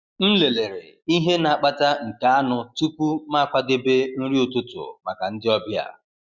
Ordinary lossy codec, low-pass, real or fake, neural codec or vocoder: Opus, 64 kbps; 7.2 kHz; real; none